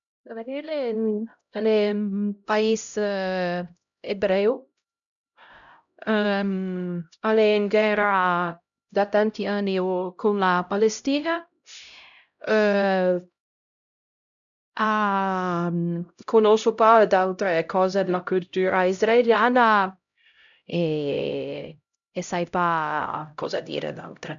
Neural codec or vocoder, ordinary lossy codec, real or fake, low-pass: codec, 16 kHz, 0.5 kbps, X-Codec, HuBERT features, trained on LibriSpeech; none; fake; 7.2 kHz